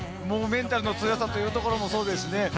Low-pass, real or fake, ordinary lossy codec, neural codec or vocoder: none; real; none; none